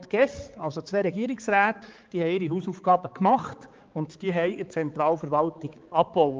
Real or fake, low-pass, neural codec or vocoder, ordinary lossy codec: fake; 7.2 kHz; codec, 16 kHz, 4 kbps, X-Codec, HuBERT features, trained on balanced general audio; Opus, 24 kbps